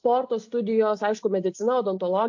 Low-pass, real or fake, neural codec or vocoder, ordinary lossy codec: 7.2 kHz; real; none; AAC, 48 kbps